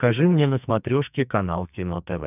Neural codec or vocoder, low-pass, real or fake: codec, 44.1 kHz, 2.6 kbps, SNAC; 3.6 kHz; fake